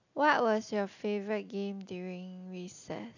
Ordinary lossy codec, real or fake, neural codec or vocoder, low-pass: none; real; none; 7.2 kHz